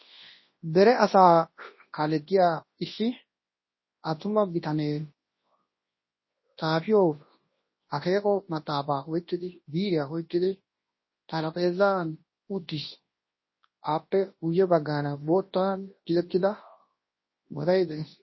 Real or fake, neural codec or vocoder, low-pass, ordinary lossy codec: fake; codec, 24 kHz, 0.9 kbps, WavTokenizer, large speech release; 7.2 kHz; MP3, 24 kbps